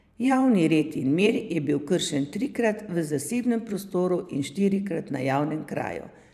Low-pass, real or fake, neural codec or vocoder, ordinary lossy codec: 14.4 kHz; fake; vocoder, 44.1 kHz, 128 mel bands every 512 samples, BigVGAN v2; none